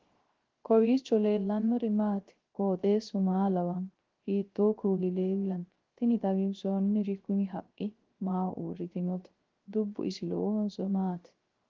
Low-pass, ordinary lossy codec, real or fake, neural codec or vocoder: 7.2 kHz; Opus, 16 kbps; fake; codec, 16 kHz, 0.3 kbps, FocalCodec